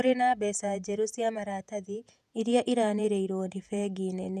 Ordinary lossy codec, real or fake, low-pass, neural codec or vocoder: none; fake; 14.4 kHz; vocoder, 48 kHz, 128 mel bands, Vocos